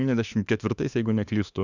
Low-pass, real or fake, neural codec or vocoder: 7.2 kHz; fake; autoencoder, 48 kHz, 32 numbers a frame, DAC-VAE, trained on Japanese speech